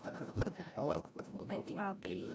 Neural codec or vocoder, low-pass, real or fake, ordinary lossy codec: codec, 16 kHz, 0.5 kbps, FreqCodec, larger model; none; fake; none